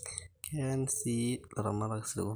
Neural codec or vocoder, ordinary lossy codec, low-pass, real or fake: none; none; none; real